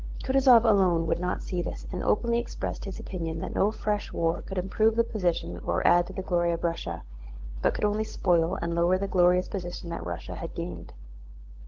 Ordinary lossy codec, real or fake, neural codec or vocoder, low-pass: Opus, 16 kbps; fake; codec, 16 kHz, 16 kbps, FunCodec, trained on LibriTTS, 50 frames a second; 7.2 kHz